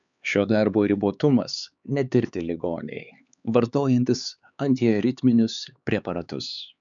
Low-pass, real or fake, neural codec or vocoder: 7.2 kHz; fake; codec, 16 kHz, 4 kbps, X-Codec, HuBERT features, trained on LibriSpeech